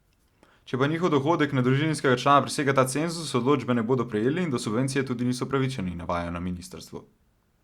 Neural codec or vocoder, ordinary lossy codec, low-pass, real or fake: none; Opus, 64 kbps; 19.8 kHz; real